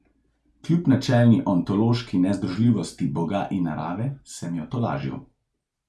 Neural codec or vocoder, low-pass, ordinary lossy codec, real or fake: none; none; none; real